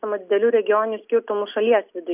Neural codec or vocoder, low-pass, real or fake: none; 3.6 kHz; real